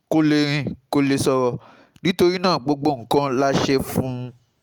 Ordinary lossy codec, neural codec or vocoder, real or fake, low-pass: Opus, 32 kbps; none; real; 19.8 kHz